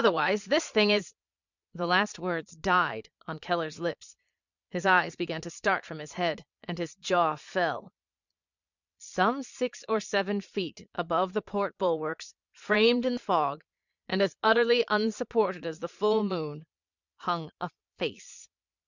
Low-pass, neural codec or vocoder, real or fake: 7.2 kHz; vocoder, 22.05 kHz, 80 mel bands, Vocos; fake